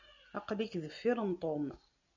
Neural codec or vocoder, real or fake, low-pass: none; real; 7.2 kHz